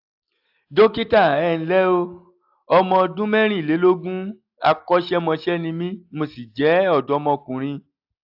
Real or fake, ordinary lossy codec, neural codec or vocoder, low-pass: real; AAC, 48 kbps; none; 5.4 kHz